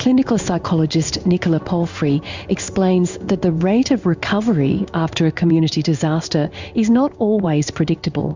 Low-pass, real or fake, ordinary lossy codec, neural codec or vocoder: 7.2 kHz; fake; Opus, 64 kbps; codec, 16 kHz in and 24 kHz out, 1 kbps, XY-Tokenizer